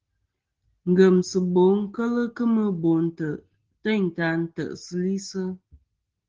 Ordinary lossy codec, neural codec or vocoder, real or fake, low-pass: Opus, 16 kbps; none; real; 7.2 kHz